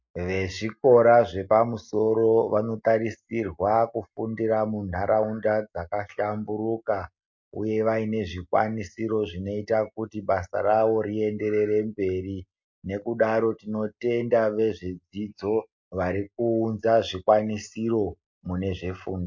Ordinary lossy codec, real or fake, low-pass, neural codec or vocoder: MP3, 48 kbps; real; 7.2 kHz; none